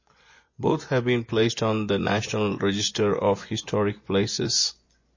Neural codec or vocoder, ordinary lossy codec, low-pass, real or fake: vocoder, 24 kHz, 100 mel bands, Vocos; MP3, 32 kbps; 7.2 kHz; fake